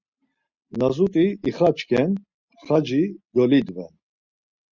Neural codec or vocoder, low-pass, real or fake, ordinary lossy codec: none; 7.2 kHz; real; Opus, 64 kbps